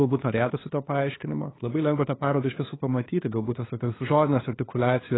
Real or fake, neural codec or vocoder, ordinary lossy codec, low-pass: fake; codec, 16 kHz, 2 kbps, FunCodec, trained on LibriTTS, 25 frames a second; AAC, 16 kbps; 7.2 kHz